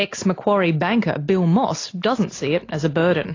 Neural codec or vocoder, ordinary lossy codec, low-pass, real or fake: none; AAC, 32 kbps; 7.2 kHz; real